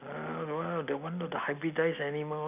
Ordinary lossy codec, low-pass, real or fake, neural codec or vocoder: none; 3.6 kHz; real; none